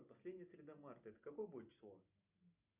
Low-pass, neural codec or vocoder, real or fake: 3.6 kHz; none; real